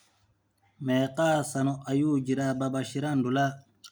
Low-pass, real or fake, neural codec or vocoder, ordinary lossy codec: none; real; none; none